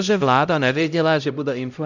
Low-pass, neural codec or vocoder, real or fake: 7.2 kHz; codec, 16 kHz, 0.5 kbps, X-Codec, HuBERT features, trained on LibriSpeech; fake